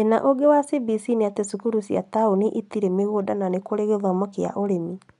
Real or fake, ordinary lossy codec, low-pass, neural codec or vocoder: real; none; 10.8 kHz; none